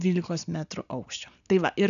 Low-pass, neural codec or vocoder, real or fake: 7.2 kHz; none; real